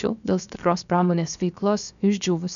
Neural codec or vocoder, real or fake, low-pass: codec, 16 kHz, 0.7 kbps, FocalCodec; fake; 7.2 kHz